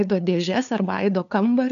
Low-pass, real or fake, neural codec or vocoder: 7.2 kHz; fake; codec, 16 kHz, 2 kbps, FunCodec, trained on LibriTTS, 25 frames a second